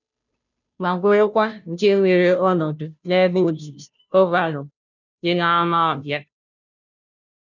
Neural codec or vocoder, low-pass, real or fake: codec, 16 kHz, 0.5 kbps, FunCodec, trained on Chinese and English, 25 frames a second; 7.2 kHz; fake